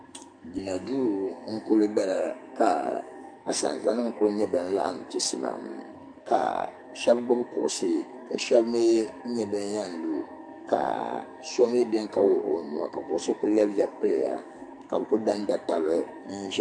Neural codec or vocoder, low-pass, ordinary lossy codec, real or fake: codec, 44.1 kHz, 2.6 kbps, SNAC; 9.9 kHz; MP3, 64 kbps; fake